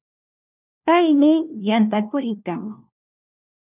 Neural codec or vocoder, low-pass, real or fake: codec, 16 kHz, 1 kbps, FunCodec, trained on LibriTTS, 50 frames a second; 3.6 kHz; fake